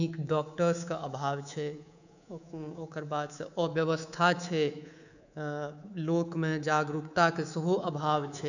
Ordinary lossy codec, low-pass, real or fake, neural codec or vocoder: none; 7.2 kHz; fake; codec, 24 kHz, 3.1 kbps, DualCodec